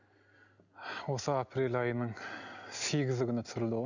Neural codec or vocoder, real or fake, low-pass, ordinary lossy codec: none; real; 7.2 kHz; none